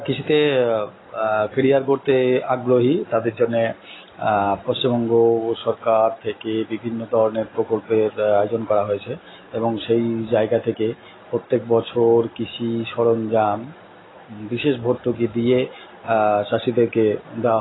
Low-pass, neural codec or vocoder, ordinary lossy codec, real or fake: 7.2 kHz; none; AAC, 16 kbps; real